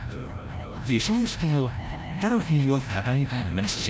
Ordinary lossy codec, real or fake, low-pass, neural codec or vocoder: none; fake; none; codec, 16 kHz, 0.5 kbps, FreqCodec, larger model